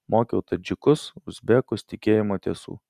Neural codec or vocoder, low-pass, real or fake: none; 14.4 kHz; real